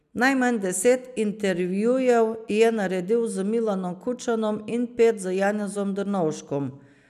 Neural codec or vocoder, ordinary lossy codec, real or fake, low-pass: none; none; real; 14.4 kHz